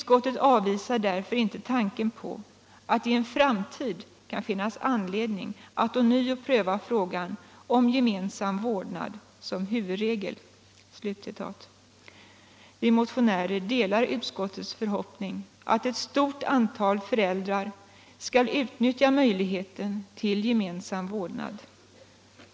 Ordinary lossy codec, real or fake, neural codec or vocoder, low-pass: none; real; none; none